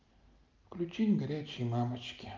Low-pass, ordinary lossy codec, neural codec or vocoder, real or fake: 7.2 kHz; Opus, 32 kbps; none; real